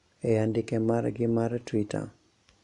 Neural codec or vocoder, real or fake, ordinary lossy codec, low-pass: none; real; none; 10.8 kHz